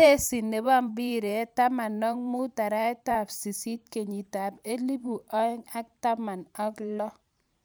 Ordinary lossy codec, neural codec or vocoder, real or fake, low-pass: none; vocoder, 44.1 kHz, 128 mel bands every 512 samples, BigVGAN v2; fake; none